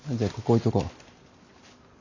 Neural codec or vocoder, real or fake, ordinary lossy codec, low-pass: none; real; none; 7.2 kHz